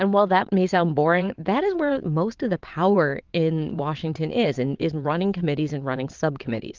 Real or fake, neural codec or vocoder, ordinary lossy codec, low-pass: fake; vocoder, 22.05 kHz, 80 mel bands, WaveNeXt; Opus, 32 kbps; 7.2 kHz